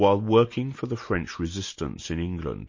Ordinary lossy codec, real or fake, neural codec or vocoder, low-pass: MP3, 32 kbps; real; none; 7.2 kHz